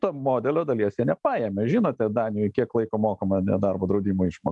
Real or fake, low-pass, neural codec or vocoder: real; 10.8 kHz; none